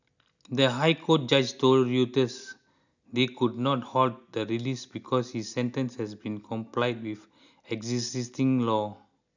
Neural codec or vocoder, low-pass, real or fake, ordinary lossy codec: none; 7.2 kHz; real; none